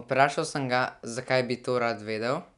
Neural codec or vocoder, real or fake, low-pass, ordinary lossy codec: none; real; 10.8 kHz; none